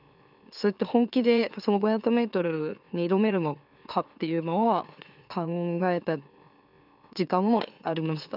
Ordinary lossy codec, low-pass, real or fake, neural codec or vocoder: none; 5.4 kHz; fake; autoencoder, 44.1 kHz, a latent of 192 numbers a frame, MeloTTS